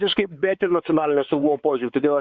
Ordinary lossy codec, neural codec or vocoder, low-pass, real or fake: Opus, 64 kbps; codec, 16 kHz, 4 kbps, X-Codec, WavLM features, trained on Multilingual LibriSpeech; 7.2 kHz; fake